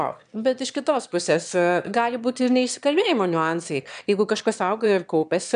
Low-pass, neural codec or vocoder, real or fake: 9.9 kHz; autoencoder, 22.05 kHz, a latent of 192 numbers a frame, VITS, trained on one speaker; fake